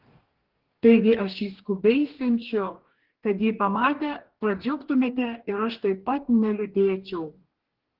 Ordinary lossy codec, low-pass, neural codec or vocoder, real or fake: Opus, 16 kbps; 5.4 kHz; codec, 44.1 kHz, 2.6 kbps, DAC; fake